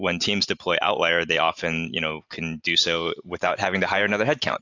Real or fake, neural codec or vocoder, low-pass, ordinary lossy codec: real; none; 7.2 kHz; AAC, 48 kbps